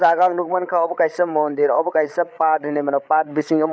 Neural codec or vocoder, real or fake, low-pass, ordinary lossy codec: codec, 16 kHz, 8 kbps, FreqCodec, larger model; fake; none; none